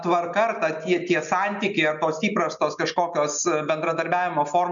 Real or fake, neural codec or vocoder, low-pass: real; none; 7.2 kHz